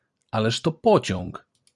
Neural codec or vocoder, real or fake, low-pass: vocoder, 44.1 kHz, 128 mel bands every 256 samples, BigVGAN v2; fake; 10.8 kHz